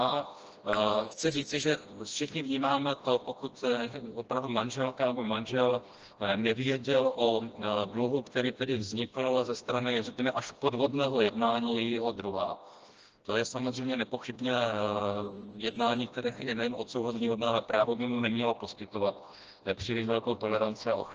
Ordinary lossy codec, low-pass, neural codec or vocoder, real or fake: Opus, 24 kbps; 7.2 kHz; codec, 16 kHz, 1 kbps, FreqCodec, smaller model; fake